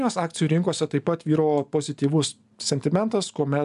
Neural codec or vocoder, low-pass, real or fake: none; 10.8 kHz; real